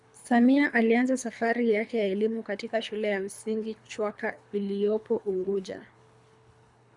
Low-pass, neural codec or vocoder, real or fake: 10.8 kHz; codec, 24 kHz, 3 kbps, HILCodec; fake